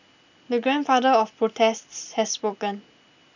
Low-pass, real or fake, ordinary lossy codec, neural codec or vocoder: 7.2 kHz; real; none; none